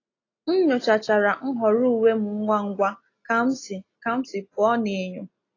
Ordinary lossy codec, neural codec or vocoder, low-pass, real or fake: AAC, 32 kbps; none; 7.2 kHz; real